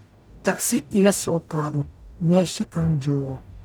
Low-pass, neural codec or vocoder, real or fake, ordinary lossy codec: none; codec, 44.1 kHz, 0.9 kbps, DAC; fake; none